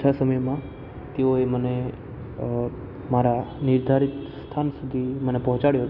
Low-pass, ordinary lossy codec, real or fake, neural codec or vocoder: 5.4 kHz; Opus, 64 kbps; real; none